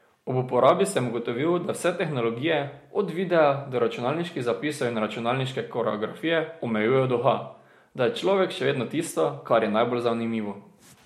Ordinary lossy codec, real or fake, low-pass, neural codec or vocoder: MP3, 64 kbps; real; 19.8 kHz; none